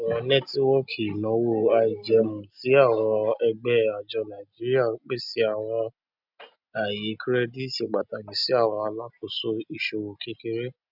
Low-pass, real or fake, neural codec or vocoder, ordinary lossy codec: 5.4 kHz; real; none; none